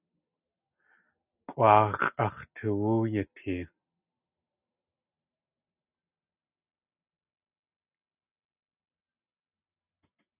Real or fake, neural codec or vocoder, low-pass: real; none; 3.6 kHz